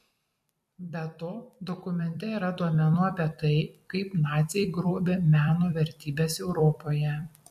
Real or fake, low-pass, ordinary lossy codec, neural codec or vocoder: fake; 14.4 kHz; MP3, 64 kbps; vocoder, 44.1 kHz, 128 mel bands every 256 samples, BigVGAN v2